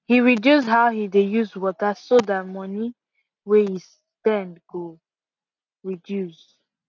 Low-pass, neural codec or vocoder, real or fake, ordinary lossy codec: 7.2 kHz; none; real; none